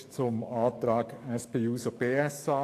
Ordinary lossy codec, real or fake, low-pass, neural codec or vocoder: AAC, 64 kbps; fake; 14.4 kHz; codec, 44.1 kHz, 7.8 kbps, DAC